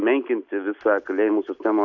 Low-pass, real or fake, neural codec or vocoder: 7.2 kHz; real; none